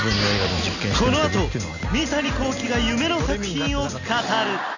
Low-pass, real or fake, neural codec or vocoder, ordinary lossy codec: 7.2 kHz; real; none; none